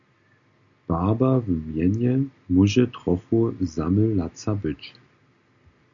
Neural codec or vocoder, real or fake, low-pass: none; real; 7.2 kHz